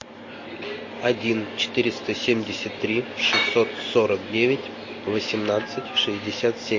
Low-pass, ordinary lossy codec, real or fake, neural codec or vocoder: 7.2 kHz; MP3, 32 kbps; real; none